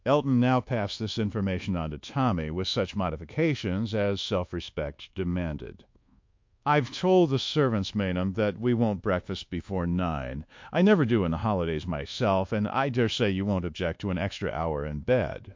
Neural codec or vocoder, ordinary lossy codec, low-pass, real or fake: codec, 24 kHz, 1.2 kbps, DualCodec; MP3, 48 kbps; 7.2 kHz; fake